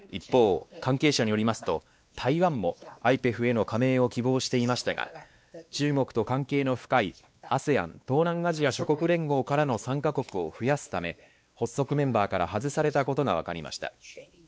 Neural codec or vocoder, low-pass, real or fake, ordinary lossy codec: codec, 16 kHz, 2 kbps, X-Codec, WavLM features, trained on Multilingual LibriSpeech; none; fake; none